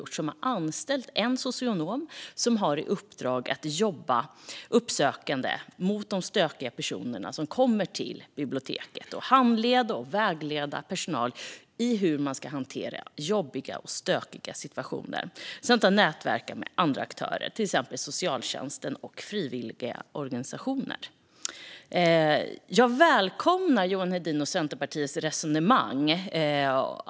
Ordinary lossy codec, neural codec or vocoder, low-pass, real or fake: none; none; none; real